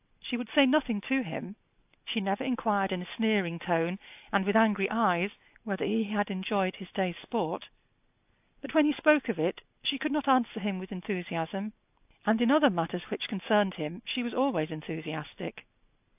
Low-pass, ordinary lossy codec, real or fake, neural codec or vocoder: 3.6 kHz; AAC, 32 kbps; real; none